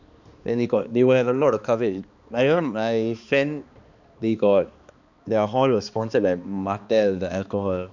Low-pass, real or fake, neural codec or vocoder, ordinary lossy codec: 7.2 kHz; fake; codec, 16 kHz, 2 kbps, X-Codec, HuBERT features, trained on balanced general audio; Opus, 64 kbps